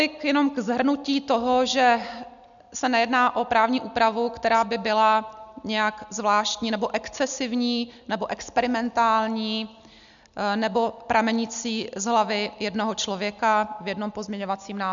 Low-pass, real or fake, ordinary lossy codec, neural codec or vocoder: 7.2 kHz; real; MP3, 96 kbps; none